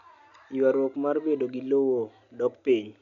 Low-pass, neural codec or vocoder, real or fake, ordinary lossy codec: 7.2 kHz; none; real; none